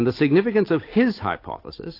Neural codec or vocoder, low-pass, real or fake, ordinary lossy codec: none; 5.4 kHz; real; AAC, 32 kbps